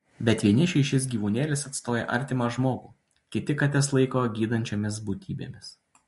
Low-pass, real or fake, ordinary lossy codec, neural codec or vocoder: 14.4 kHz; real; MP3, 48 kbps; none